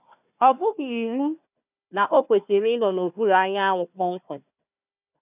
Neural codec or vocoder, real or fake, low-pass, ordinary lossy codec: codec, 16 kHz, 1 kbps, FunCodec, trained on Chinese and English, 50 frames a second; fake; 3.6 kHz; none